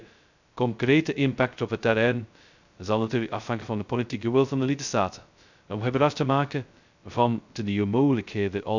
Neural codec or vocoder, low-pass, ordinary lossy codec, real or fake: codec, 16 kHz, 0.2 kbps, FocalCodec; 7.2 kHz; none; fake